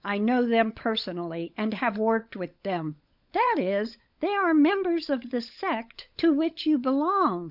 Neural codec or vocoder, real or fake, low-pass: codec, 16 kHz, 16 kbps, FunCodec, trained on LibriTTS, 50 frames a second; fake; 5.4 kHz